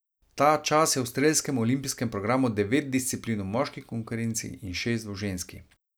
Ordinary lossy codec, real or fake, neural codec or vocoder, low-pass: none; real; none; none